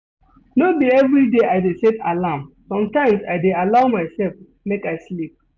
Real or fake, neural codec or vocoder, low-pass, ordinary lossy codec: real; none; none; none